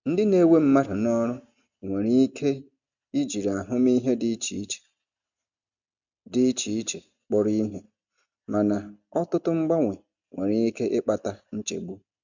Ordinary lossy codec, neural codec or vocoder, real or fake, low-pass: none; none; real; 7.2 kHz